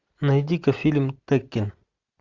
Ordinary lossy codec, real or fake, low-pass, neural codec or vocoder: Opus, 64 kbps; real; 7.2 kHz; none